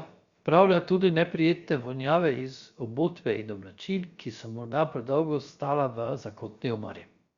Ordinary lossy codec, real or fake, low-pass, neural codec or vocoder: Opus, 64 kbps; fake; 7.2 kHz; codec, 16 kHz, about 1 kbps, DyCAST, with the encoder's durations